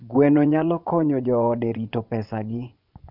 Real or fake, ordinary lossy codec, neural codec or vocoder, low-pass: fake; none; vocoder, 24 kHz, 100 mel bands, Vocos; 5.4 kHz